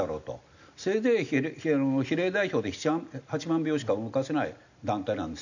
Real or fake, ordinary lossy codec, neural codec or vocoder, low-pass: real; none; none; 7.2 kHz